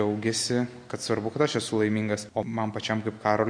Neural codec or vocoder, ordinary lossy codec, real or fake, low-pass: none; MP3, 48 kbps; real; 9.9 kHz